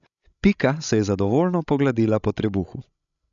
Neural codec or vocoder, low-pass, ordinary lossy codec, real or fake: codec, 16 kHz, 16 kbps, FreqCodec, larger model; 7.2 kHz; none; fake